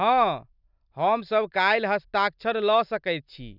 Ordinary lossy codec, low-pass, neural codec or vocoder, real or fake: none; 5.4 kHz; none; real